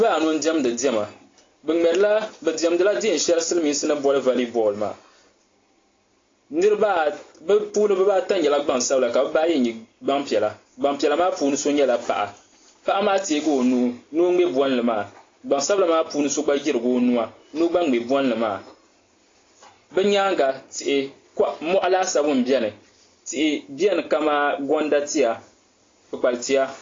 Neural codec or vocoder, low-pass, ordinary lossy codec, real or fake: none; 7.2 kHz; AAC, 32 kbps; real